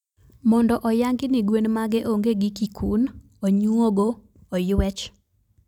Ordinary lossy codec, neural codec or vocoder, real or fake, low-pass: none; none; real; 19.8 kHz